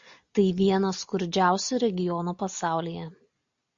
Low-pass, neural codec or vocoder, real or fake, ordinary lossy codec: 7.2 kHz; none; real; MP3, 48 kbps